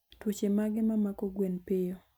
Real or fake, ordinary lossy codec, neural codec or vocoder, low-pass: real; none; none; none